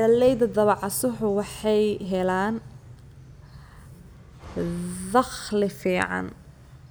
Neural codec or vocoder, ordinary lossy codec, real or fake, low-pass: none; none; real; none